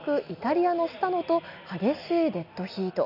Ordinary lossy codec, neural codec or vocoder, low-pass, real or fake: none; none; 5.4 kHz; real